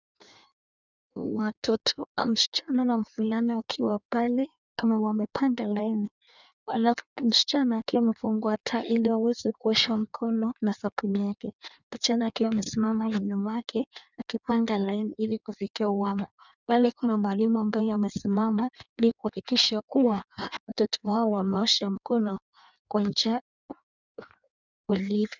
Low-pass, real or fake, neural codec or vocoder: 7.2 kHz; fake; codec, 16 kHz in and 24 kHz out, 1.1 kbps, FireRedTTS-2 codec